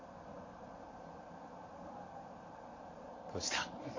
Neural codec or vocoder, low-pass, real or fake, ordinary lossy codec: vocoder, 44.1 kHz, 128 mel bands every 512 samples, BigVGAN v2; 7.2 kHz; fake; MP3, 32 kbps